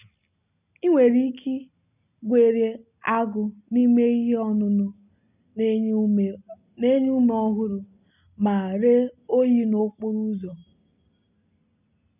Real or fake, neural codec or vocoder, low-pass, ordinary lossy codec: real; none; 3.6 kHz; none